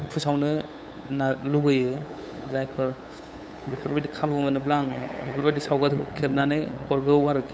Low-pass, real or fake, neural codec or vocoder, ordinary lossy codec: none; fake; codec, 16 kHz, 8 kbps, FunCodec, trained on LibriTTS, 25 frames a second; none